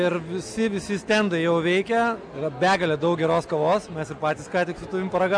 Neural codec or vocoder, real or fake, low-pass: none; real; 9.9 kHz